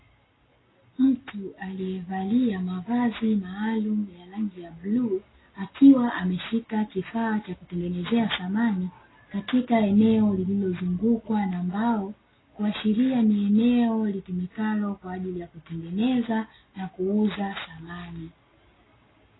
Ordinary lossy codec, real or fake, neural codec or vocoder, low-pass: AAC, 16 kbps; real; none; 7.2 kHz